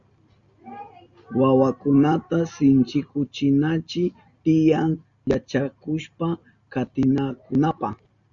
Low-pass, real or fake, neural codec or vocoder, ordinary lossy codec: 7.2 kHz; real; none; Opus, 64 kbps